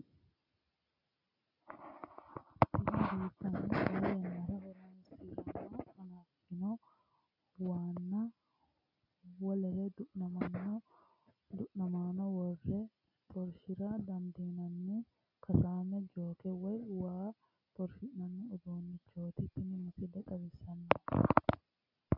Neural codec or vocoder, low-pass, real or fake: none; 5.4 kHz; real